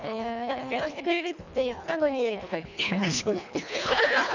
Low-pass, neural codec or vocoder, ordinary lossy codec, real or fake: 7.2 kHz; codec, 24 kHz, 1.5 kbps, HILCodec; none; fake